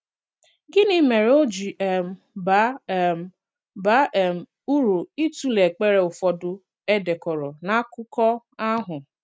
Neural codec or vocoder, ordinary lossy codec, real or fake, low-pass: none; none; real; none